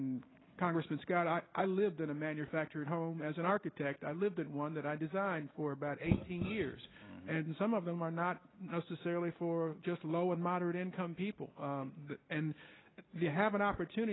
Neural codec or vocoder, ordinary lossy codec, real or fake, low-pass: none; AAC, 16 kbps; real; 7.2 kHz